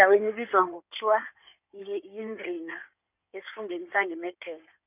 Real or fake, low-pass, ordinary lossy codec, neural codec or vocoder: fake; 3.6 kHz; none; codec, 16 kHz in and 24 kHz out, 2.2 kbps, FireRedTTS-2 codec